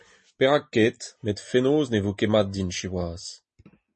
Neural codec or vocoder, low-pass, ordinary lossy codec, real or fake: none; 10.8 kHz; MP3, 32 kbps; real